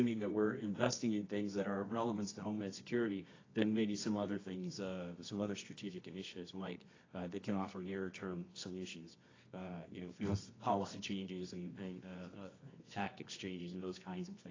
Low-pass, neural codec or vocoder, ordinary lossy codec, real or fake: 7.2 kHz; codec, 24 kHz, 0.9 kbps, WavTokenizer, medium music audio release; AAC, 32 kbps; fake